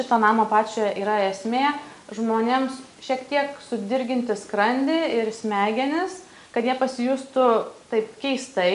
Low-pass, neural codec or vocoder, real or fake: 10.8 kHz; none; real